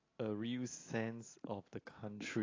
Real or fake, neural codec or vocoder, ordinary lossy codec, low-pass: real; none; AAC, 32 kbps; 7.2 kHz